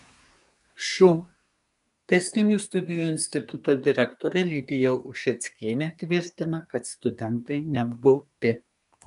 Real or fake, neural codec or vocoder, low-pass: fake; codec, 24 kHz, 1 kbps, SNAC; 10.8 kHz